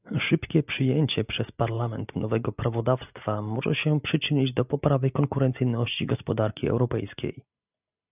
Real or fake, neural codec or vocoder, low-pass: real; none; 3.6 kHz